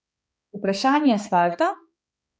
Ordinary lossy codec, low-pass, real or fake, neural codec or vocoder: none; none; fake; codec, 16 kHz, 2 kbps, X-Codec, HuBERT features, trained on balanced general audio